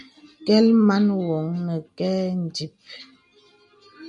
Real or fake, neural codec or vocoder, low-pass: real; none; 10.8 kHz